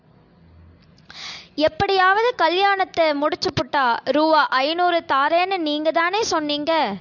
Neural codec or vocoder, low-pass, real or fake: none; 7.2 kHz; real